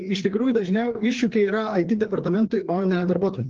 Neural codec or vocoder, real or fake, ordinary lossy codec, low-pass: codec, 16 kHz, 2 kbps, FreqCodec, larger model; fake; Opus, 16 kbps; 7.2 kHz